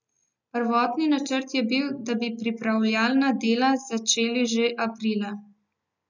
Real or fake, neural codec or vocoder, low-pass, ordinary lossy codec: real; none; 7.2 kHz; none